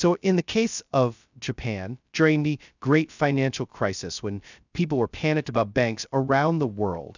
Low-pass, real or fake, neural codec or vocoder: 7.2 kHz; fake; codec, 16 kHz, 0.2 kbps, FocalCodec